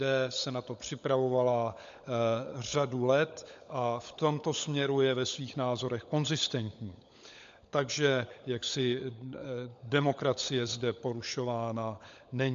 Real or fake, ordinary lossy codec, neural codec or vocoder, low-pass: fake; AAC, 64 kbps; codec, 16 kHz, 16 kbps, FunCodec, trained on LibriTTS, 50 frames a second; 7.2 kHz